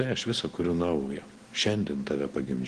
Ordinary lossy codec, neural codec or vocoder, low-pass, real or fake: Opus, 16 kbps; none; 9.9 kHz; real